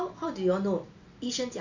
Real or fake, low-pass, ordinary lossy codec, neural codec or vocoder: real; 7.2 kHz; none; none